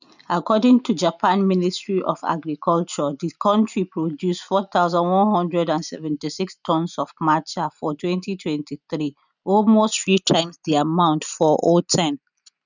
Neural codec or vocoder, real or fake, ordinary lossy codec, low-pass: none; real; none; 7.2 kHz